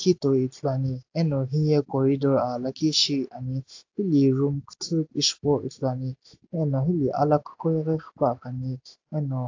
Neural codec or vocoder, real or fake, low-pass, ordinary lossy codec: none; real; 7.2 kHz; AAC, 48 kbps